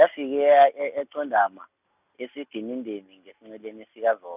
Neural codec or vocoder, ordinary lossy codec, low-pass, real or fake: none; none; 3.6 kHz; real